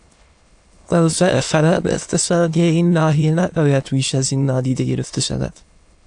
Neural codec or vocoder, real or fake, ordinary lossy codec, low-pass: autoencoder, 22.05 kHz, a latent of 192 numbers a frame, VITS, trained on many speakers; fake; AAC, 64 kbps; 9.9 kHz